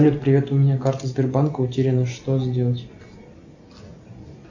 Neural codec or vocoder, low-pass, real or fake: none; 7.2 kHz; real